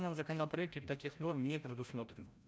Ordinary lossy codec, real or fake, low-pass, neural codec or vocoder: none; fake; none; codec, 16 kHz, 0.5 kbps, FreqCodec, larger model